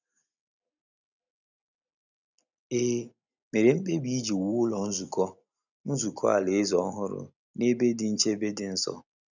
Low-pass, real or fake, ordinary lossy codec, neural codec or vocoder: 7.2 kHz; real; none; none